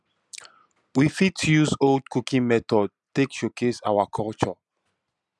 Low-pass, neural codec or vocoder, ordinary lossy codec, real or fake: none; none; none; real